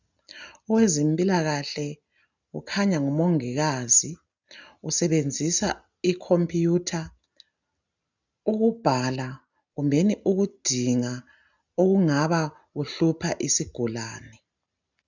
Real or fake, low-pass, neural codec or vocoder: real; 7.2 kHz; none